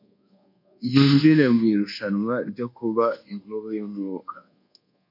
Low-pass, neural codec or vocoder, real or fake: 5.4 kHz; codec, 24 kHz, 1.2 kbps, DualCodec; fake